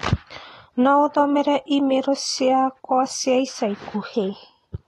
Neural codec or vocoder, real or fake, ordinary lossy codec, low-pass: none; real; AAC, 32 kbps; 19.8 kHz